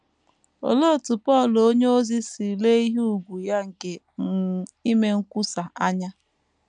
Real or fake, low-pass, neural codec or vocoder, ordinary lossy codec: real; 9.9 kHz; none; MP3, 96 kbps